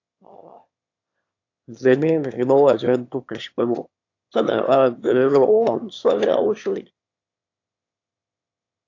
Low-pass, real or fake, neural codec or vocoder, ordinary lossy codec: 7.2 kHz; fake; autoencoder, 22.05 kHz, a latent of 192 numbers a frame, VITS, trained on one speaker; AAC, 48 kbps